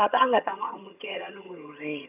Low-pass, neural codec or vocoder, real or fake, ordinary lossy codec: 3.6 kHz; vocoder, 22.05 kHz, 80 mel bands, HiFi-GAN; fake; none